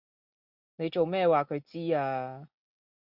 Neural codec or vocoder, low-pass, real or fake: none; 5.4 kHz; real